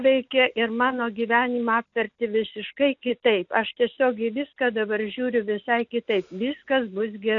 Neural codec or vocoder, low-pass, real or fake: none; 7.2 kHz; real